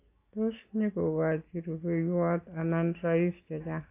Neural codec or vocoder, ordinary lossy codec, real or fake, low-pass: none; AAC, 24 kbps; real; 3.6 kHz